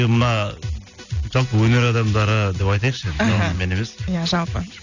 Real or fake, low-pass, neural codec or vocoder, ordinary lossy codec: real; 7.2 kHz; none; none